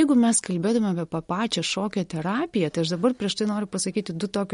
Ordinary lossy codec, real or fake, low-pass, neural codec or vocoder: MP3, 48 kbps; real; 10.8 kHz; none